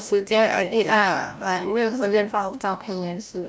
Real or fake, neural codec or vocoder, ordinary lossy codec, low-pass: fake; codec, 16 kHz, 0.5 kbps, FreqCodec, larger model; none; none